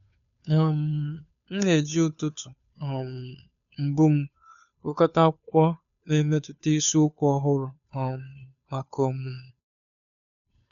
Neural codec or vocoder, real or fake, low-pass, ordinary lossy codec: codec, 16 kHz, 2 kbps, FunCodec, trained on Chinese and English, 25 frames a second; fake; 7.2 kHz; none